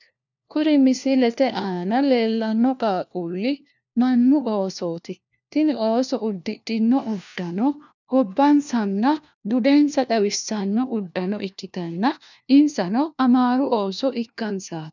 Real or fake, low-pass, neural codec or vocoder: fake; 7.2 kHz; codec, 16 kHz, 1 kbps, FunCodec, trained on LibriTTS, 50 frames a second